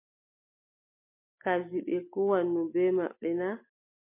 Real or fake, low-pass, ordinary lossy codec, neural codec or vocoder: real; 3.6 kHz; MP3, 24 kbps; none